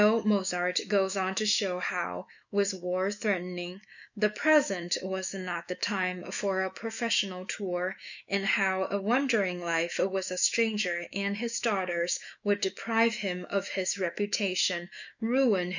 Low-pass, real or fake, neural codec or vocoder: 7.2 kHz; fake; autoencoder, 48 kHz, 128 numbers a frame, DAC-VAE, trained on Japanese speech